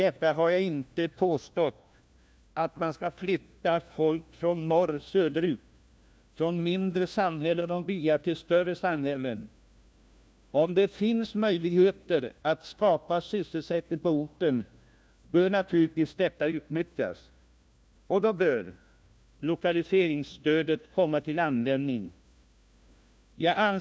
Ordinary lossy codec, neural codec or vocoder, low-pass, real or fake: none; codec, 16 kHz, 1 kbps, FunCodec, trained on LibriTTS, 50 frames a second; none; fake